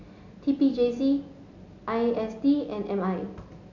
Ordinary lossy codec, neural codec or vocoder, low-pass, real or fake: none; none; 7.2 kHz; real